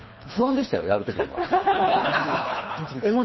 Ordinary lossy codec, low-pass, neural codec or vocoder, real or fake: MP3, 24 kbps; 7.2 kHz; codec, 24 kHz, 3 kbps, HILCodec; fake